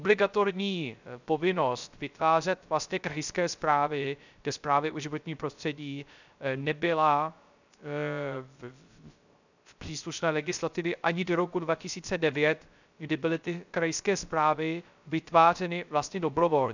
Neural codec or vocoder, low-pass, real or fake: codec, 16 kHz, 0.3 kbps, FocalCodec; 7.2 kHz; fake